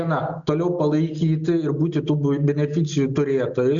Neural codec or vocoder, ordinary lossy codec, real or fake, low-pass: none; Opus, 64 kbps; real; 7.2 kHz